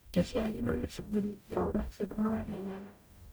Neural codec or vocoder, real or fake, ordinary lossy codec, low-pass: codec, 44.1 kHz, 0.9 kbps, DAC; fake; none; none